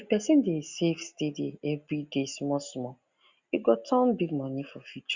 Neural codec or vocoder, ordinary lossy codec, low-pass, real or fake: none; Opus, 64 kbps; 7.2 kHz; real